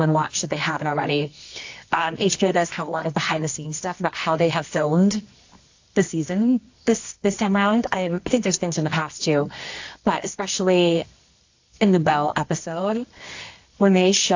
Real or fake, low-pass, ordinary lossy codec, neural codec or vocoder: fake; 7.2 kHz; AAC, 48 kbps; codec, 24 kHz, 0.9 kbps, WavTokenizer, medium music audio release